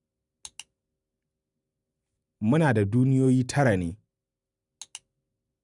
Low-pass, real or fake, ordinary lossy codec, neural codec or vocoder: 10.8 kHz; real; none; none